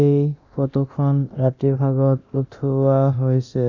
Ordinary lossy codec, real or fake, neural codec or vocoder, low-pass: none; fake; codec, 24 kHz, 0.9 kbps, DualCodec; 7.2 kHz